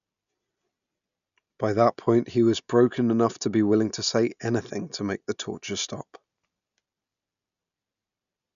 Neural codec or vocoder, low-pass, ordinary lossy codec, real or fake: none; 7.2 kHz; none; real